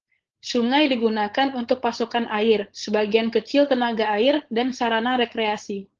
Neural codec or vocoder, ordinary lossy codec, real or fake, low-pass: codec, 16 kHz, 4.8 kbps, FACodec; Opus, 16 kbps; fake; 7.2 kHz